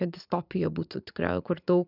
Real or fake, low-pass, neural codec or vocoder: fake; 5.4 kHz; vocoder, 44.1 kHz, 80 mel bands, Vocos